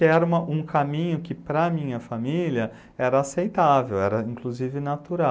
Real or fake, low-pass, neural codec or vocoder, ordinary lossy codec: real; none; none; none